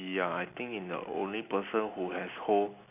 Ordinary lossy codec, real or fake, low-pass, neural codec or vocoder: none; real; 3.6 kHz; none